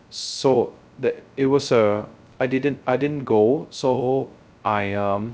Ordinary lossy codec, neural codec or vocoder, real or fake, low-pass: none; codec, 16 kHz, 0.2 kbps, FocalCodec; fake; none